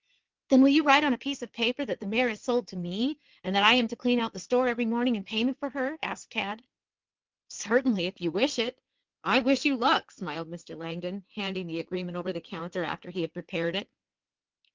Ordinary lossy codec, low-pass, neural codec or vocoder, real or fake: Opus, 24 kbps; 7.2 kHz; codec, 16 kHz in and 24 kHz out, 2.2 kbps, FireRedTTS-2 codec; fake